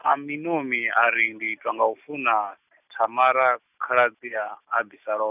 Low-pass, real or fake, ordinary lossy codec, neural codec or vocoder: 3.6 kHz; real; none; none